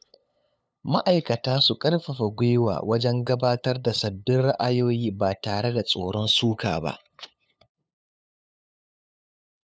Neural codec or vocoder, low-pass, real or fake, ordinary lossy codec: codec, 16 kHz, 8 kbps, FunCodec, trained on LibriTTS, 25 frames a second; none; fake; none